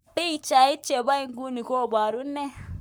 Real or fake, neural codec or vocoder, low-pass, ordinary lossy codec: fake; codec, 44.1 kHz, 7.8 kbps, Pupu-Codec; none; none